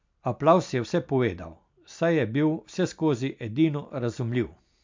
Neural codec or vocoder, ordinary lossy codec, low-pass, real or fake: none; MP3, 64 kbps; 7.2 kHz; real